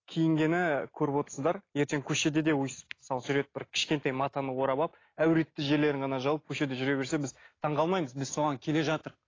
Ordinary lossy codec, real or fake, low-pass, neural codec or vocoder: AAC, 32 kbps; real; 7.2 kHz; none